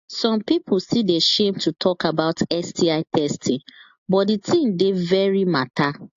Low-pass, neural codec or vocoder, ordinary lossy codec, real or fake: 7.2 kHz; none; AAC, 48 kbps; real